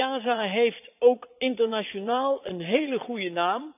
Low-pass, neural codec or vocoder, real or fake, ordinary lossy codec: 3.6 kHz; vocoder, 22.05 kHz, 80 mel bands, Vocos; fake; none